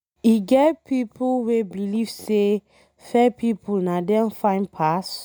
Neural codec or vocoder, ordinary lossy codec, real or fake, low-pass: none; none; real; none